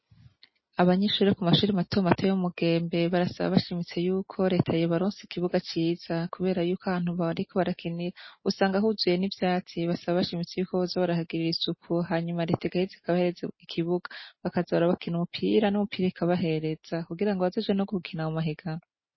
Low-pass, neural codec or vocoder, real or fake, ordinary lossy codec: 7.2 kHz; none; real; MP3, 24 kbps